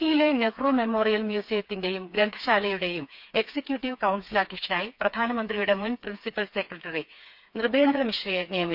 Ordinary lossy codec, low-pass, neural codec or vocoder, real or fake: none; 5.4 kHz; codec, 16 kHz, 4 kbps, FreqCodec, smaller model; fake